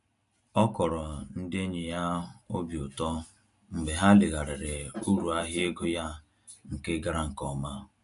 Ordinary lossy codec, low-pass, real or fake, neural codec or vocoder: none; 10.8 kHz; real; none